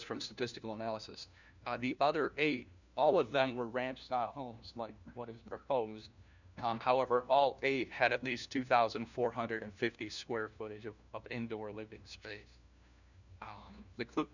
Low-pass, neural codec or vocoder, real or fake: 7.2 kHz; codec, 16 kHz, 1 kbps, FunCodec, trained on LibriTTS, 50 frames a second; fake